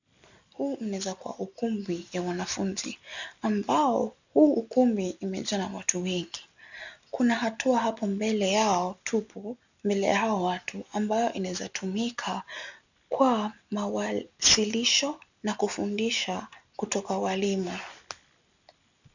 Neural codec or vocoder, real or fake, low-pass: none; real; 7.2 kHz